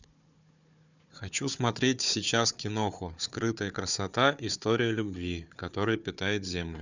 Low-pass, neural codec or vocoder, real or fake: 7.2 kHz; codec, 16 kHz, 4 kbps, FunCodec, trained on Chinese and English, 50 frames a second; fake